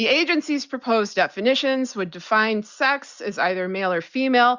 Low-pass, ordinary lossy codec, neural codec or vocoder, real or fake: 7.2 kHz; Opus, 64 kbps; none; real